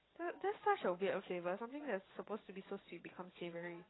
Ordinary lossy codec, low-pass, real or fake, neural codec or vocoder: AAC, 16 kbps; 7.2 kHz; fake; vocoder, 22.05 kHz, 80 mel bands, WaveNeXt